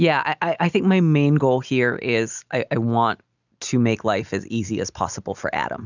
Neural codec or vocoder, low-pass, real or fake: none; 7.2 kHz; real